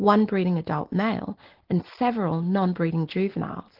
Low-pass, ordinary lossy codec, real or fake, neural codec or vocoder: 5.4 kHz; Opus, 16 kbps; real; none